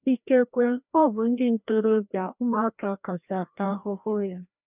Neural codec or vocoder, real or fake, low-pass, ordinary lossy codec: codec, 16 kHz, 1 kbps, FreqCodec, larger model; fake; 3.6 kHz; none